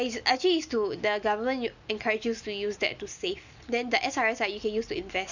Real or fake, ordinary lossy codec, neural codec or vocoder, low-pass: real; none; none; 7.2 kHz